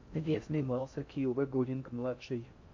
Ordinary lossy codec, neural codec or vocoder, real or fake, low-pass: MP3, 48 kbps; codec, 16 kHz in and 24 kHz out, 0.6 kbps, FocalCodec, streaming, 4096 codes; fake; 7.2 kHz